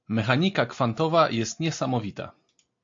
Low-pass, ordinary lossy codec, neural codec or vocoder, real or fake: 7.2 kHz; MP3, 48 kbps; none; real